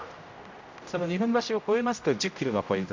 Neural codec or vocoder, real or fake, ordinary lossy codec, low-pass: codec, 16 kHz, 0.5 kbps, X-Codec, HuBERT features, trained on general audio; fake; MP3, 48 kbps; 7.2 kHz